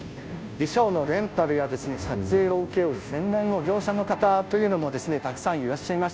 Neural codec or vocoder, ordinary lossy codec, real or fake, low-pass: codec, 16 kHz, 0.5 kbps, FunCodec, trained on Chinese and English, 25 frames a second; none; fake; none